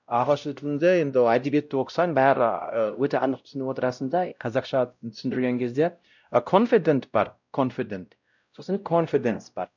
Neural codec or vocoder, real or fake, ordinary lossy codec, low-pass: codec, 16 kHz, 0.5 kbps, X-Codec, WavLM features, trained on Multilingual LibriSpeech; fake; none; 7.2 kHz